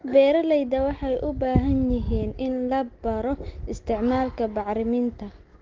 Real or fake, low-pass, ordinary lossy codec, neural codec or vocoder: real; 7.2 kHz; Opus, 16 kbps; none